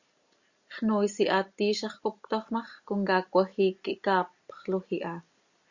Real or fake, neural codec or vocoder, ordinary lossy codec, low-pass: real; none; Opus, 64 kbps; 7.2 kHz